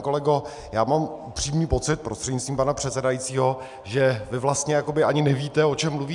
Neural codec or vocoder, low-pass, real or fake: none; 10.8 kHz; real